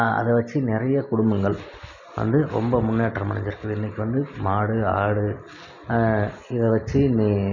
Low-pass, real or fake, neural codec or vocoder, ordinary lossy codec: 7.2 kHz; real; none; none